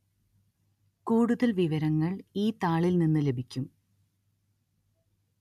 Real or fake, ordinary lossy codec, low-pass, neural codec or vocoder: real; none; 14.4 kHz; none